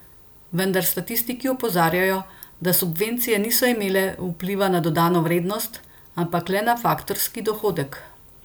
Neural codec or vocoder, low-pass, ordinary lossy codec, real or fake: none; none; none; real